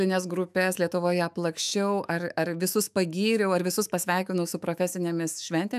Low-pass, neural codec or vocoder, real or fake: 14.4 kHz; autoencoder, 48 kHz, 128 numbers a frame, DAC-VAE, trained on Japanese speech; fake